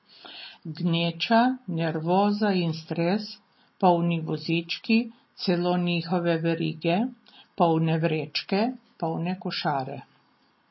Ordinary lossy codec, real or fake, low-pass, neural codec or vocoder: MP3, 24 kbps; real; 7.2 kHz; none